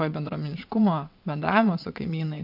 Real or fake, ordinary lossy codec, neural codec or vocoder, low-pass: fake; MP3, 48 kbps; vocoder, 22.05 kHz, 80 mel bands, WaveNeXt; 5.4 kHz